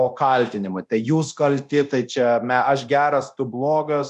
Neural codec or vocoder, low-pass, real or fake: codec, 24 kHz, 0.9 kbps, DualCodec; 10.8 kHz; fake